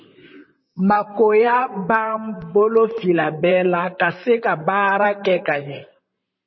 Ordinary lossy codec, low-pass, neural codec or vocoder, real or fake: MP3, 24 kbps; 7.2 kHz; vocoder, 44.1 kHz, 128 mel bands, Pupu-Vocoder; fake